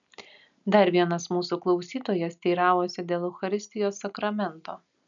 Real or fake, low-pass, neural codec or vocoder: real; 7.2 kHz; none